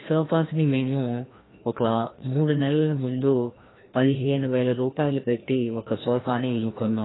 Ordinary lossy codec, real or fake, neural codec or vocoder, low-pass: AAC, 16 kbps; fake; codec, 16 kHz, 1 kbps, FreqCodec, larger model; 7.2 kHz